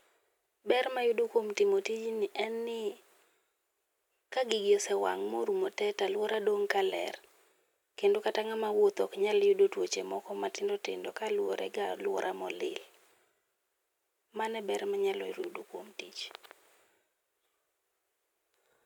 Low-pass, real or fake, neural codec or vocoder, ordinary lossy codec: 19.8 kHz; real; none; none